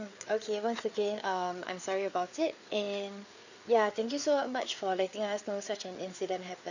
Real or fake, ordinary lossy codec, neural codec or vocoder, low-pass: fake; none; codec, 16 kHz, 4 kbps, FreqCodec, larger model; 7.2 kHz